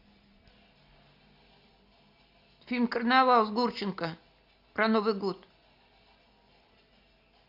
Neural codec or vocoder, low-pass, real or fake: none; 5.4 kHz; real